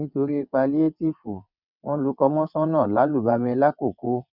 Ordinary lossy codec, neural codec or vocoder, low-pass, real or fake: none; vocoder, 22.05 kHz, 80 mel bands, WaveNeXt; 5.4 kHz; fake